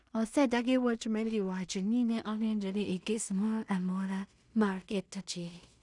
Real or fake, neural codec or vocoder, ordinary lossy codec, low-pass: fake; codec, 16 kHz in and 24 kHz out, 0.4 kbps, LongCat-Audio-Codec, two codebook decoder; none; 10.8 kHz